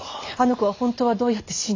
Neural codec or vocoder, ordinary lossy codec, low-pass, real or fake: none; AAC, 32 kbps; 7.2 kHz; real